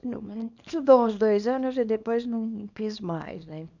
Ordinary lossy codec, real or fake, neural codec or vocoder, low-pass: none; fake; codec, 24 kHz, 0.9 kbps, WavTokenizer, small release; 7.2 kHz